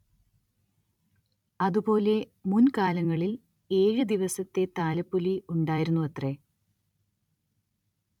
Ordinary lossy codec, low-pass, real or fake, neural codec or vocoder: none; 19.8 kHz; fake; vocoder, 44.1 kHz, 128 mel bands every 256 samples, BigVGAN v2